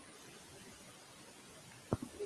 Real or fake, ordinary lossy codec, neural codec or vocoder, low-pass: real; Opus, 32 kbps; none; 10.8 kHz